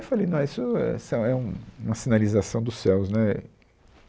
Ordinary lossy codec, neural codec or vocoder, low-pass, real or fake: none; none; none; real